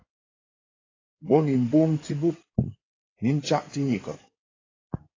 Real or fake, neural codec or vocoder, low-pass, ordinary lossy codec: fake; codec, 16 kHz in and 24 kHz out, 2.2 kbps, FireRedTTS-2 codec; 7.2 kHz; AAC, 32 kbps